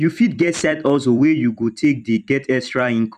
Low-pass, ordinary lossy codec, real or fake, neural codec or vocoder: 14.4 kHz; none; fake; vocoder, 44.1 kHz, 128 mel bands every 256 samples, BigVGAN v2